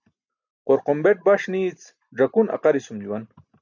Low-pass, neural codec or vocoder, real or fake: 7.2 kHz; none; real